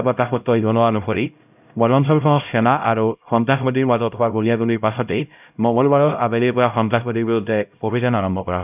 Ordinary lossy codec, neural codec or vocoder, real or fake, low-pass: none; codec, 16 kHz, 0.5 kbps, X-Codec, HuBERT features, trained on LibriSpeech; fake; 3.6 kHz